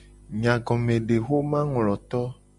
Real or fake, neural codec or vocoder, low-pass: real; none; 10.8 kHz